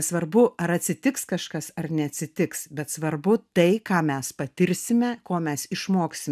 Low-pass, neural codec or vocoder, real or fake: 14.4 kHz; none; real